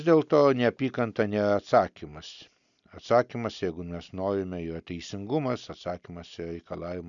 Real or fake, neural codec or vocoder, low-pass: real; none; 7.2 kHz